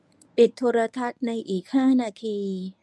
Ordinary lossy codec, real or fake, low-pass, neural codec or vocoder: none; fake; none; codec, 24 kHz, 0.9 kbps, WavTokenizer, medium speech release version 1